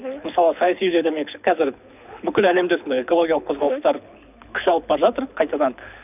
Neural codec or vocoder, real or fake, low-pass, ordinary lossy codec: codec, 24 kHz, 6 kbps, HILCodec; fake; 3.6 kHz; none